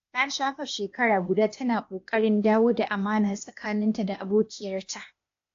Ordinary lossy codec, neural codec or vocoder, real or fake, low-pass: AAC, 48 kbps; codec, 16 kHz, 0.8 kbps, ZipCodec; fake; 7.2 kHz